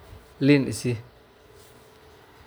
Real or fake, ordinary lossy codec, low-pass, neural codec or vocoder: real; none; none; none